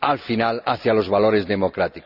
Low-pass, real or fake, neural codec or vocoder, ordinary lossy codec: 5.4 kHz; real; none; none